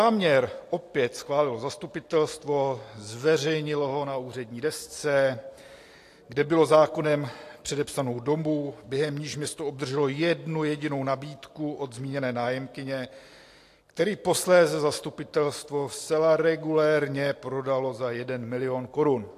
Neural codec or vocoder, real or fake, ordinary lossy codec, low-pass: none; real; AAC, 64 kbps; 14.4 kHz